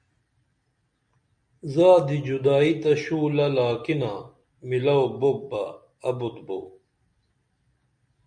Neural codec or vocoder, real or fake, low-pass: none; real; 9.9 kHz